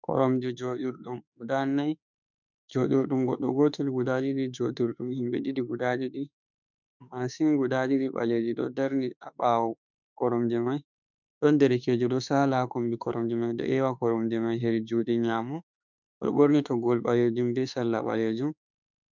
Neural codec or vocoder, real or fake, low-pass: autoencoder, 48 kHz, 32 numbers a frame, DAC-VAE, trained on Japanese speech; fake; 7.2 kHz